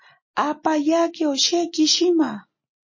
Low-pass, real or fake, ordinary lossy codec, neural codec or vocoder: 7.2 kHz; real; MP3, 32 kbps; none